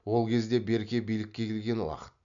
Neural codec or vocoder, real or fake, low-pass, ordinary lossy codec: none; real; 7.2 kHz; none